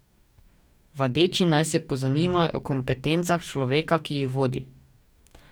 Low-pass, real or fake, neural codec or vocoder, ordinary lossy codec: none; fake; codec, 44.1 kHz, 2.6 kbps, DAC; none